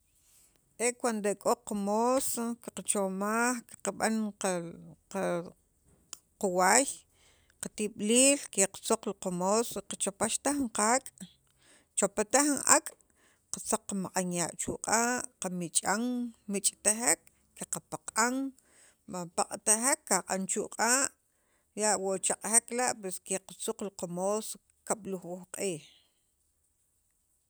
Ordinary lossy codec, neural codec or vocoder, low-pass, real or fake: none; none; none; real